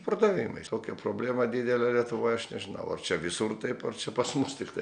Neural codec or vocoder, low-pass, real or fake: none; 9.9 kHz; real